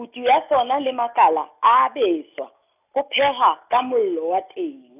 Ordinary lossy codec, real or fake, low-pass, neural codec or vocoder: none; real; 3.6 kHz; none